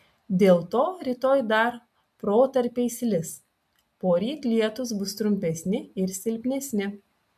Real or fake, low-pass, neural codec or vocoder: real; 14.4 kHz; none